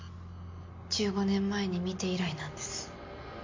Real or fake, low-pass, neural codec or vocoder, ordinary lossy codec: real; 7.2 kHz; none; MP3, 48 kbps